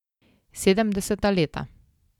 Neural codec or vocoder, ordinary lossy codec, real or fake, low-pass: none; none; real; 19.8 kHz